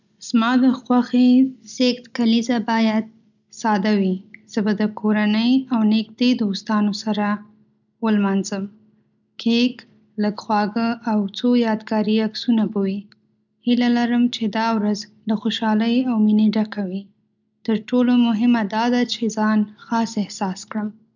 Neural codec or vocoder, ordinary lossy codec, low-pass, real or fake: none; none; 7.2 kHz; real